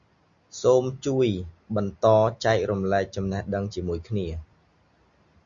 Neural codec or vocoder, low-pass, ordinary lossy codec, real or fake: none; 7.2 kHz; Opus, 64 kbps; real